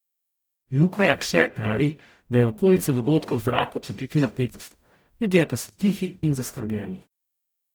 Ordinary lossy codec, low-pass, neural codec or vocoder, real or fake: none; none; codec, 44.1 kHz, 0.9 kbps, DAC; fake